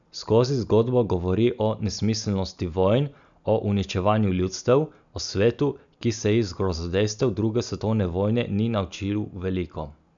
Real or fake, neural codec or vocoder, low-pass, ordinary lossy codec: real; none; 7.2 kHz; none